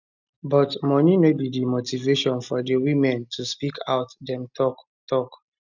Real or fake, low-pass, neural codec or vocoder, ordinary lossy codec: real; 7.2 kHz; none; none